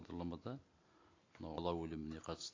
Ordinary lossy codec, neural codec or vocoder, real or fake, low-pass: AAC, 32 kbps; none; real; 7.2 kHz